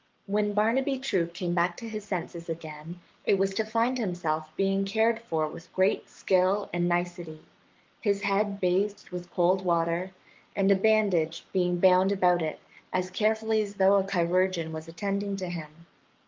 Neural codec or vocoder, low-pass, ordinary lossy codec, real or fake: codec, 44.1 kHz, 7.8 kbps, Pupu-Codec; 7.2 kHz; Opus, 24 kbps; fake